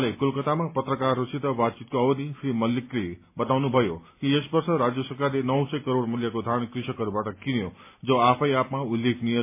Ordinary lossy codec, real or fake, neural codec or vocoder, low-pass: MP3, 24 kbps; real; none; 3.6 kHz